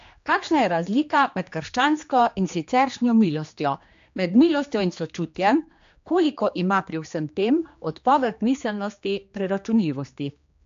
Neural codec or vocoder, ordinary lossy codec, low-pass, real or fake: codec, 16 kHz, 2 kbps, X-Codec, HuBERT features, trained on general audio; AAC, 64 kbps; 7.2 kHz; fake